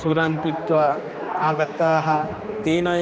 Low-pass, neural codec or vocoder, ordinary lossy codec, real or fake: none; codec, 16 kHz, 2 kbps, X-Codec, HuBERT features, trained on general audio; none; fake